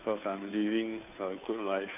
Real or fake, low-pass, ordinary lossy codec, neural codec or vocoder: fake; 3.6 kHz; none; codec, 16 kHz, 2 kbps, FunCodec, trained on Chinese and English, 25 frames a second